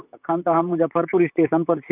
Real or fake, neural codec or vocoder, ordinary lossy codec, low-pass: fake; vocoder, 44.1 kHz, 128 mel bands, Pupu-Vocoder; none; 3.6 kHz